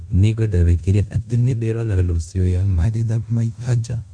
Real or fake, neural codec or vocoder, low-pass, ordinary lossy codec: fake; codec, 16 kHz in and 24 kHz out, 0.9 kbps, LongCat-Audio-Codec, fine tuned four codebook decoder; 9.9 kHz; none